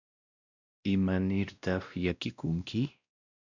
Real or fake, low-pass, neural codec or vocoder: fake; 7.2 kHz; codec, 16 kHz, 1 kbps, X-Codec, WavLM features, trained on Multilingual LibriSpeech